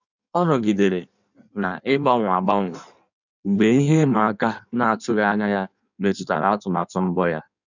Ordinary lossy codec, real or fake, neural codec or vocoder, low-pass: none; fake; codec, 16 kHz in and 24 kHz out, 1.1 kbps, FireRedTTS-2 codec; 7.2 kHz